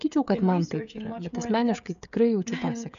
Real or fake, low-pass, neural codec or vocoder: fake; 7.2 kHz; codec, 16 kHz, 16 kbps, FreqCodec, smaller model